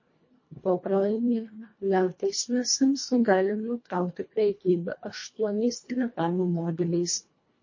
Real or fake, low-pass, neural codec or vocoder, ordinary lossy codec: fake; 7.2 kHz; codec, 24 kHz, 1.5 kbps, HILCodec; MP3, 32 kbps